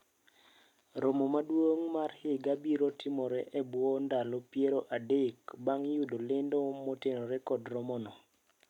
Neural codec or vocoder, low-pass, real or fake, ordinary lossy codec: none; 19.8 kHz; real; none